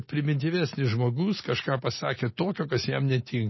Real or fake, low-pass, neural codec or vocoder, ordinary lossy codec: real; 7.2 kHz; none; MP3, 24 kbps